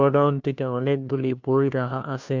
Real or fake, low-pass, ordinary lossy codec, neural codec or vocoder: fake; 7.2 kHz; MP3, 64 kbps; codec, 16 kHz, 1 kbps, FunCodec, trained on LibriTTS, 50 frames a second